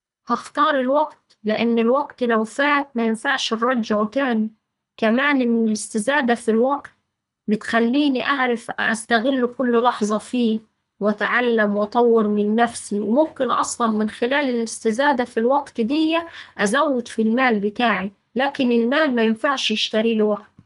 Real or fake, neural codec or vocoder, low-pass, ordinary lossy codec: fake; codec, 24 kHz, 3 kbps, HILCodec; 10.8 kHz; none